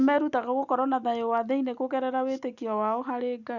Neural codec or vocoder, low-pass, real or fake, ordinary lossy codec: none; 7.2 kHz; real; none